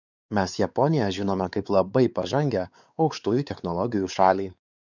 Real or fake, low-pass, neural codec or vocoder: fake; 7.2 kHz; codec, 16 kHz, 4 kbps, X-Codec, WavLM features, trained on Multilingual LibriSpeech